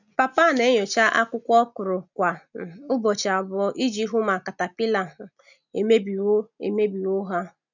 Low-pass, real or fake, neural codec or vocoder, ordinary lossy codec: 7.2 kHz; real; none; none